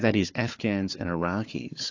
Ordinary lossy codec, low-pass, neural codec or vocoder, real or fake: AAC, 48 kbps; 7.2 kHz; codec, 16 kHz, 4 kbps, FunCodec, trained on Chinese and English, 50 frames a second; fake